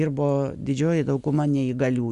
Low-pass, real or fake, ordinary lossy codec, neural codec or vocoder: 10.8 kHz; real; Opus, 64 kbps; none